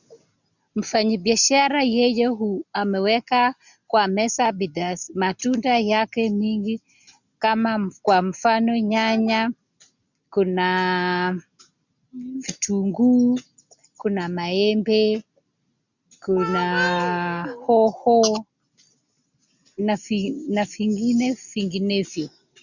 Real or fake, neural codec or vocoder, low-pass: real; none; 7.2 kHz